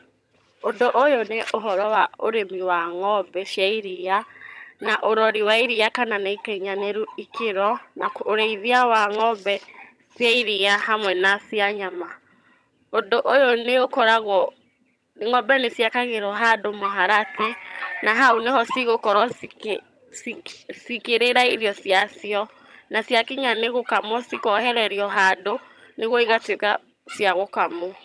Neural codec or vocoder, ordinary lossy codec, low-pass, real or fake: vocoder, 22.05 kHz, 80 mel bands, HiFi-GAN; none; none; fake